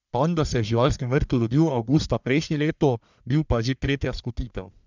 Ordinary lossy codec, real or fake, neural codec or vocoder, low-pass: none; fake; codec, 44.1 kHz, 1.7 kbps, Pupu-Codec; 7.2 kHz